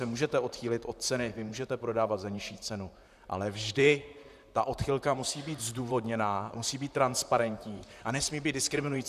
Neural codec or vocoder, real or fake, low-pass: vocoder, 44.1 kHz, 128 mel bands, Pupu-Vocoder; fake; 14.4 kHz